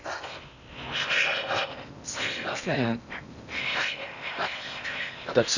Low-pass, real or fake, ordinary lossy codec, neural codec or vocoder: 7.2 kHz; fake; none; codec, 16 kHz in and 24 kHz out, 0.8 kbps, FocalCodec, streaming, 65536 codes